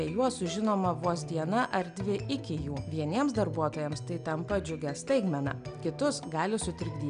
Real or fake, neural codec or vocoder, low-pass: real; none; 9.9 kHz